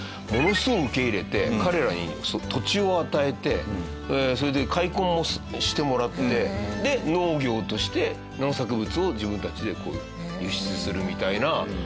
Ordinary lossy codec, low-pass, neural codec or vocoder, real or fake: none; none; none; real